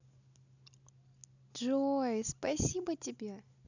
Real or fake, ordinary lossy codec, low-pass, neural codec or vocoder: real; none; 7.2 kHz; none